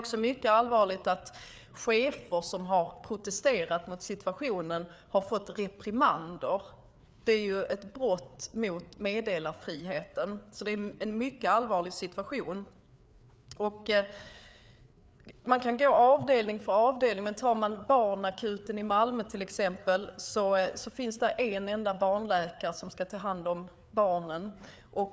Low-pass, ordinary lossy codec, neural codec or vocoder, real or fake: none; none; codec, 16 kHz, 4 kbps, FreqCodec, larger model; fake